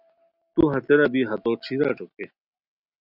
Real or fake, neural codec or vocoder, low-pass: real; none; 5.4 kHz